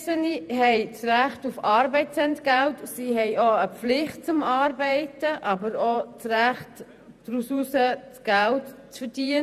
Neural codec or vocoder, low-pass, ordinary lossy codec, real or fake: vocoder, 48 kHz, 128 mel bands, Vocos; 14.4 kHz; none; fake